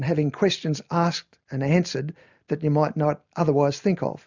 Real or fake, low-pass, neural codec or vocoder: real; 7.2 kHz; none